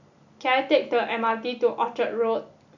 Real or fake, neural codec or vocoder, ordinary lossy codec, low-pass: real; none; none; 7.2 kHz